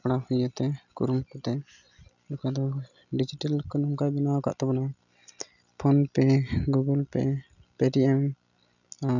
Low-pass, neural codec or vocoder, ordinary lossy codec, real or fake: 7.2 kHz; none; none; real